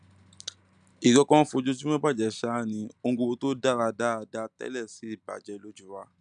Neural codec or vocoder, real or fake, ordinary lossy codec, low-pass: none; real; none; 9.9 kHz